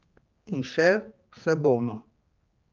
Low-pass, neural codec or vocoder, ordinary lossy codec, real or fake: 7.2 kHz; codec, 16 kHz, 2 kbps, X-Codec, HuBERT features, trained on general audio; Opus, 24 kbps; fake